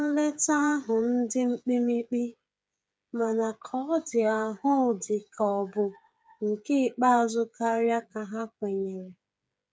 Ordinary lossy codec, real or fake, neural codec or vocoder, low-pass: none; fake; codec, 16 kHz, 8 kbps, FreqCodec, smaller model; none